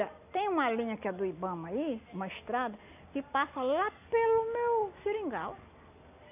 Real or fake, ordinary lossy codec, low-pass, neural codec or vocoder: real; none; 3.6 kHz; none